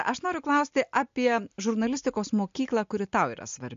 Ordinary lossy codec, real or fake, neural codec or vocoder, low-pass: MP3, 48 kbps; real; none; 7.2 kHz